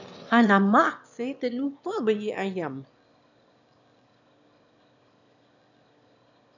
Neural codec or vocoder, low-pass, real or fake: autoencoder, 22.05 kHz, a latent of 192 numbers a frame, VITS, trained on one speaker; 7.2 kHz; fake